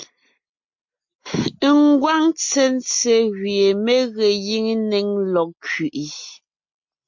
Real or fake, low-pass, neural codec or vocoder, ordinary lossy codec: real; 7.2 kHz; none; MP3, 48 kbps